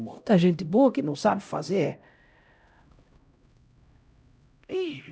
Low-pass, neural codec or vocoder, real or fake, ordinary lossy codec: none; codec, 16 kHz, 0.5 kbps, X-Codec, HuBERT features, trained on LibriSpeech; fake; none